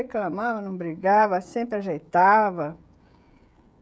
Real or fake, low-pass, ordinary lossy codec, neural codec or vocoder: fake; none; none; codec, 16 kHz, 16 kbps, FreqCodec, smaller model